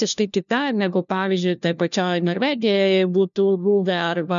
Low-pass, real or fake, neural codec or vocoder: 7.2 kHz; fake; codec, 16 kHz, 1 kbps, FunCodec, trained on LibriTTS, 50 frames a second